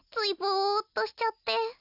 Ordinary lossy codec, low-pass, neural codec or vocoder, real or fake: none; 5.4 kHz; none; real